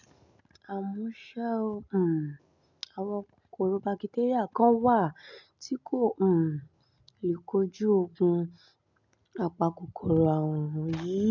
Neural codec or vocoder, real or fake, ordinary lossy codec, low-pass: none; real; MP3, 64 kbps; 7.2 kHz